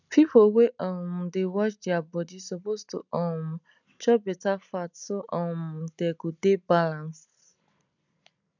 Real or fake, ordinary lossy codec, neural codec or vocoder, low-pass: real; none; none; 7.2 kHz